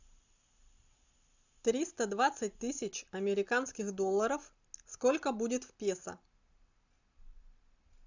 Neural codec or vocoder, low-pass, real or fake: none; 7.2 kHz; real